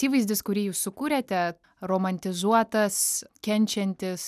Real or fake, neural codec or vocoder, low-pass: real; none; 14.4 kHz